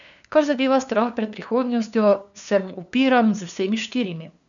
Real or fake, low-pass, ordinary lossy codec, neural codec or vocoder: fake; 7.2 kHz; none; codec, 16 kHz, 2 kbps, FunCodec, trained on LibriTTS, 25 frames a second